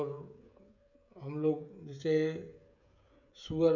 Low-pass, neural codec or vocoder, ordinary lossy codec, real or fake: 7.2 kHz; codec, 16 kHz, 16 kbps, FreqCodec, smaller model; none; fake